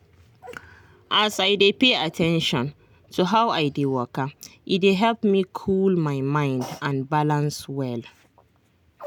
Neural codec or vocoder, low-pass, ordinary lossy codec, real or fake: none; 19.8 kHz; none; real